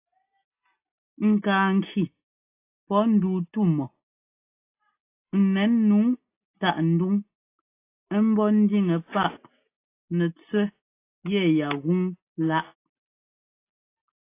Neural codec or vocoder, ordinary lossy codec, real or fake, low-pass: none; AAC, 24 kbps; real; 3.6 kHz